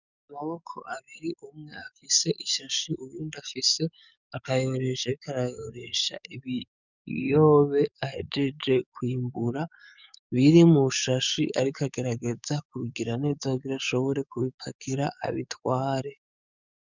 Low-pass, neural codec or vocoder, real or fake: 7.2 kHz; codec, 44.1 kHz, 7.8 kbps, DAC; fake